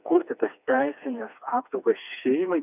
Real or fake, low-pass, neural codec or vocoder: fake; 3.6 kHz; codec, 16 kHz, 2 kbps, FreqCodec, smaller model